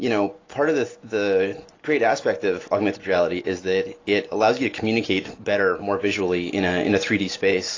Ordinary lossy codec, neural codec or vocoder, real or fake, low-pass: AAC, 32 kbps; none; real; 7.2 kHz